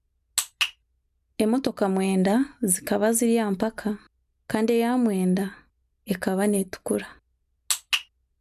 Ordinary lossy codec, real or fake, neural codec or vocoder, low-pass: none; real; none; 14.4 kHz